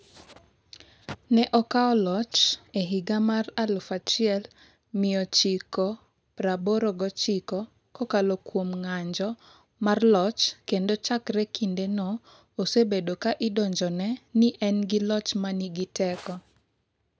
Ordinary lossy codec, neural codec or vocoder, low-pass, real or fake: none; none; none; real